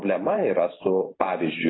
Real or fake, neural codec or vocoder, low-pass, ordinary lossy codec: fake; vocoder, 44.1 kHz, 128 mel bands every 512 samples, BigVGAN v2; 7.2 kHz; AAC, 16 kbps